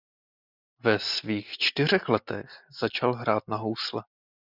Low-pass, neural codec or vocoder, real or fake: 5.4 kHz; none; real